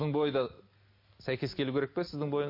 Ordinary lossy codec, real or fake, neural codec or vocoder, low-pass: MP3, 32 kbps; real; none; 5.4 kHz